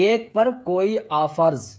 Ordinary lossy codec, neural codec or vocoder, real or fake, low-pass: none; codec, 16 kHz, 8 kbps, FreqCodec, smaller model; fake; none